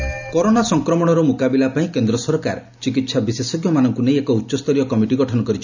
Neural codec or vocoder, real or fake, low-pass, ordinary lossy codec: none; real; 7.2 kHz; none